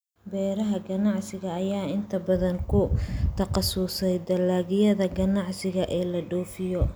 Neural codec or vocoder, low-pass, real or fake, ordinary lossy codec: none; none; real; none